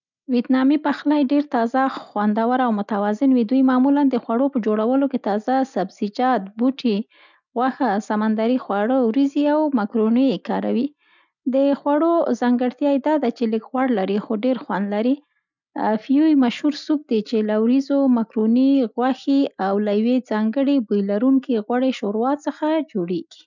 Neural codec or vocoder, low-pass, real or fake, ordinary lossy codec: none; 7.2 kHz; real; none